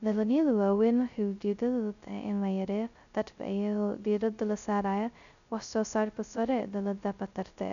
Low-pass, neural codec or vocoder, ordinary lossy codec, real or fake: 7.2 kHz; codec, 16 kHz, 0.2 kbps, FocalCodec; none; fake